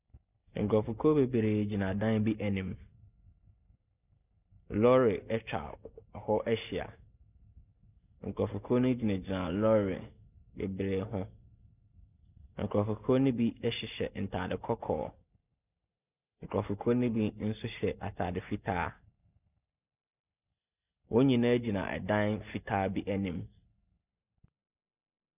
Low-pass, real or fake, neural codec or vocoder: 3.6 kHz; real; none